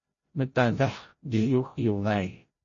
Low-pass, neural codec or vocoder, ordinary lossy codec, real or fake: 7.2 kHz; codec, 16 kHz, 0.5 kbps, FreqCodec, larger model; MP3, 32 kbps; fake